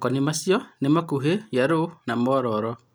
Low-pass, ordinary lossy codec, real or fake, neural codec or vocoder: none; none; real; none